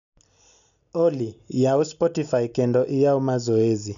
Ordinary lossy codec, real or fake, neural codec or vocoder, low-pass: none; real; none; 7.2 kHz